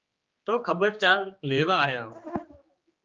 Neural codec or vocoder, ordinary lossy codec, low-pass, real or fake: codec, 16 kHz, 2 kbps, X-Codec, HuBERT features, trained on general audio; Opus, 24 kbps; 7.2 kHz; fake